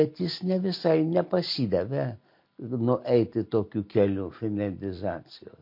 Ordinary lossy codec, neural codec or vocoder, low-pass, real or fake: MP3, 32 kbps; none; 5.4 kHz; real